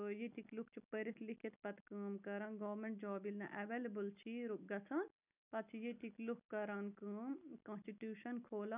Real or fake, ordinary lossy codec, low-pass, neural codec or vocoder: real; none; 3.6 kHz; none